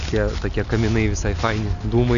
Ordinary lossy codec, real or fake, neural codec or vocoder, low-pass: AAC, 96 kbps; real; none; 7.2 kHz